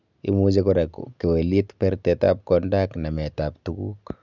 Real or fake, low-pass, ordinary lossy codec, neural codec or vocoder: real; 7.2 kHz; none; none